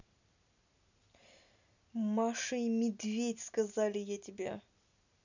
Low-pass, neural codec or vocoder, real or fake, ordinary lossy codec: 7.2 kHz; none; real; none